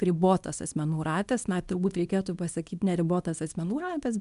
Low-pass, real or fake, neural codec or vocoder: 10.8 kHz; fake; codec, 24 kHz, 0.9 kbps, WavTokenizer, small release